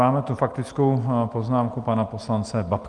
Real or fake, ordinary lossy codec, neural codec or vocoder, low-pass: real; Opus, 32 kbps; none; 10.8 kHz